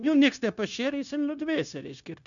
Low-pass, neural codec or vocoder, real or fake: 7.2 kHz; codec, 16 kHz, 0.9 kbps, LongCat-Audio-Codec; fake